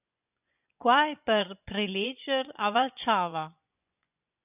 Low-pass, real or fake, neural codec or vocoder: 3.6 kHz; fake; vocoder, 24 kHz, 100 mel bands, Vocos